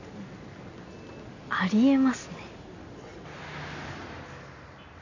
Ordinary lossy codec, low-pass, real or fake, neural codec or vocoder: none; 7.2 kHz; real; none